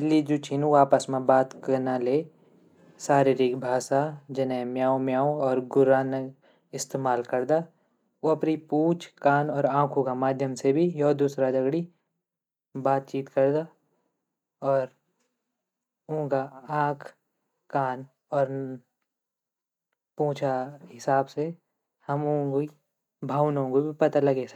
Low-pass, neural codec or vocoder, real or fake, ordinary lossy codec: 19.8 kHz; none; real; none